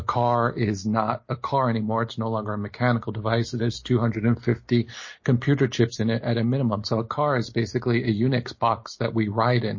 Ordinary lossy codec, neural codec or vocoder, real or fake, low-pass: MP3, 32 kbps; none; real; 7.2 kHz